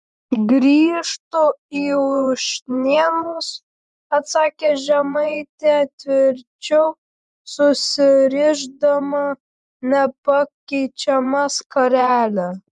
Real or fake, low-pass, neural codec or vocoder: fake; 10.8 kHz; vocoder, 24 kHz, 100 mel bands, Vocos